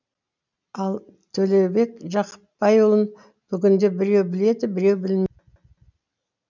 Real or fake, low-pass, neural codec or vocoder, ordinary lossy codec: real; 7.2 kHz; none; none